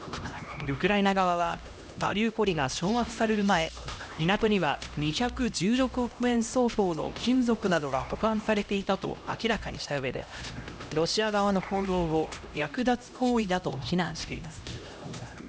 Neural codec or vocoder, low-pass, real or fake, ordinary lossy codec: codec, 16 kHz, 1 kbps, X-Codec, HuBERT features, trained on LibriSpeech; none; fake; none